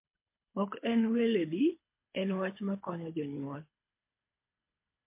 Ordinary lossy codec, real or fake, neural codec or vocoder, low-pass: MP3, 24 kbps; fake; codec, 24 kHz, 6 kbps, HILCodec; 3.6 kHz